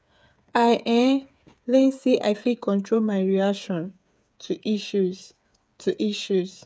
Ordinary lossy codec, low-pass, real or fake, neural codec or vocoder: none; none; fake; codec, 16 kHz, 8 kbps, FreqCodec, smaller model